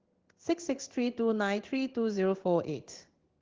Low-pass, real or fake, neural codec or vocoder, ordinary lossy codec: 7.2 kHz; fake; codec, 16 kHz in and 24 kHz out, 1 kbps, XY-Tokenizer; Opus, 16 kbps